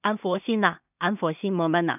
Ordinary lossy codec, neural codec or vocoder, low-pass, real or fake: none; codec, 16 kHz in and 24 kHz out, 0.4 kbps, LongCat-Audio-Codec, two codebook decoder; 3.6 kHz; fake